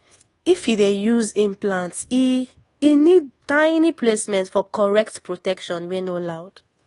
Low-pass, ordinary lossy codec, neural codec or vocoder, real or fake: 10.8 kHz; AAC, 32 kbps; codec, 24 kHz, 1.2 kbps, DualCodec; fake